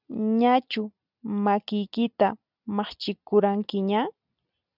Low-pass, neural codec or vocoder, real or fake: 5.4 kHz; none; real